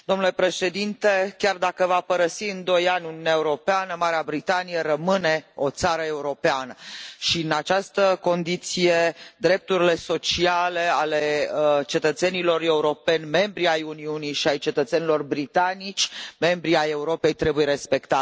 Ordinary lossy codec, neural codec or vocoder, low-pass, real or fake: none; none; none; real